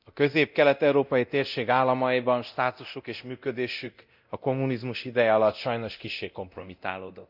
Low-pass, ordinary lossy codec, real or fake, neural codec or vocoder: 5.4 kHz; none; fake; codec, 24 kHz, 0.9 kbps, DualCodec